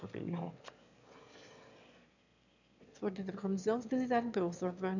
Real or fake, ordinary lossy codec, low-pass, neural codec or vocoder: fake; none; 7.2 kHz; autoencoder, 22.05 kHz, a latent of 192 numbers a frame, VITS, trained on one speaker